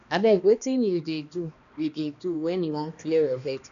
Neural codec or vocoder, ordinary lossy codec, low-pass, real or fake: codec, 16 kHz, 1 kbps, X-Codec, HuBERT features, trained on balanced general audio; none; 7.2 kHz; fake